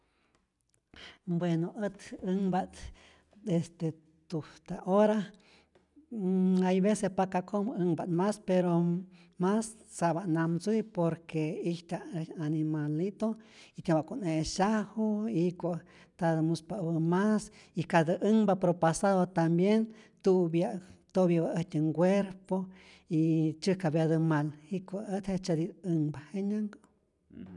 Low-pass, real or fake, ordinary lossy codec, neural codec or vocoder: 10.8 kHz; real; none; none